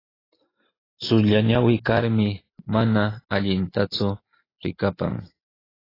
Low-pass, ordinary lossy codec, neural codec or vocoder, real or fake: 5.4 kHz; AAC, 24 kbps; none; real